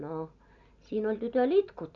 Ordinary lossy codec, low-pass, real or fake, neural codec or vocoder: MP3, 96 kbps; 7.2 kHz; real; none